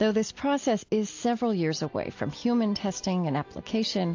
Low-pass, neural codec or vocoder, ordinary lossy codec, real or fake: 7.2 kHz; none; AAC, 48 kbps; real